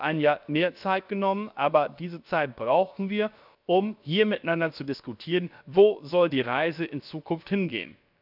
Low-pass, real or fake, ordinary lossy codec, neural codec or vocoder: 5.4 kHz; fake; none; codec, 16 kHz, about 1 kbps, DyCAST, with the encoder's durations